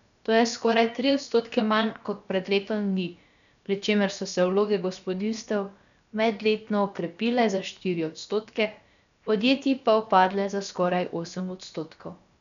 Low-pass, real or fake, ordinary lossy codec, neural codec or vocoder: 7.2 kHz; fake; none; codec, 16 kHz, about 1 kbps, DyCAST, with the encoder's durations